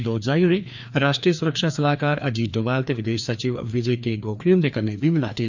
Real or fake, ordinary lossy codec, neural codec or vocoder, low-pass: fake; none; codec, 16 kHz, 2 kbps, FreqCodec, larger model; 7.2 kHz